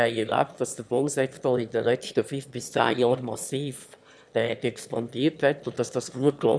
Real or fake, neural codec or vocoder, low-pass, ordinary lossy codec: fake; autoencoder, 22.05 kHz, a latent of 192 numbers a frame, VITS, trained on one speaker; none; none